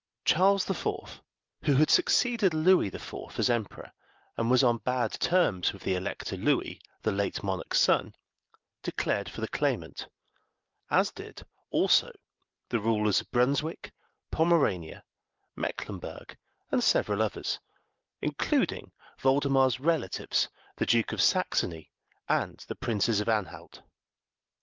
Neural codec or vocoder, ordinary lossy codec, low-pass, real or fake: none; Opus, 24 kbps; 7.2 kHz; real